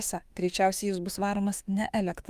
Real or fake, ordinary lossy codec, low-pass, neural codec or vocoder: fake; Opus, 32 kbps; 14.4 kHz; autoencoder, 48 kHz, 32 numbers a frame, DAC-VAE, trained on Japanese speech